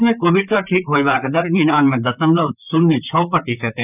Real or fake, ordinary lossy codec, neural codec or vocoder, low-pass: fake; none; vocoder, 44.1 kHz, 128 mel bands, Pupu-Vocoder; 3.6 kHz